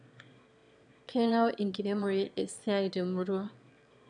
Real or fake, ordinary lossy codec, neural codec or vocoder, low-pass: fake; none; autoencoder, 22.05 kHz, a latent of 192 numbers a frame, VITS, trained on one speaker; 9.9 kHz